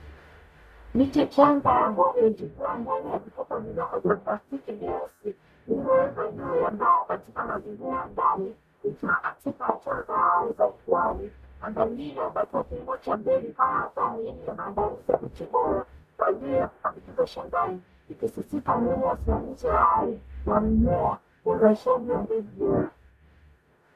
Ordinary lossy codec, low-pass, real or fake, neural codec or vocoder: MP3, 96 kbps; 14.4 kHz; fake; codec, 44.1 kHz, 0.9 kbps, DAC